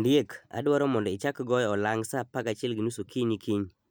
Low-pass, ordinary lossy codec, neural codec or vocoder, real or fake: none; none; none; real